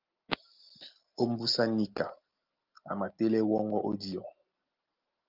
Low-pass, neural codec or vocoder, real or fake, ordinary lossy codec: 5.4 kHz; none; real; Opus, 32 kbps